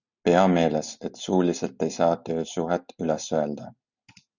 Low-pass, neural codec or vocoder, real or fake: 7.2 kHz; none; real